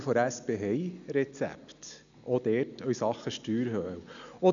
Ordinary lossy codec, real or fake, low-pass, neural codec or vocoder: none; real; 7.2 kHz; none